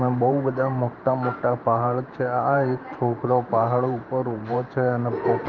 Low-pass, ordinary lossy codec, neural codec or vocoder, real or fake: 7.2 kHz; Opus, 32 kbps; vocoder, 44.1 kHz, 128 mel bands every 512 samples, BigVGAN v2; fake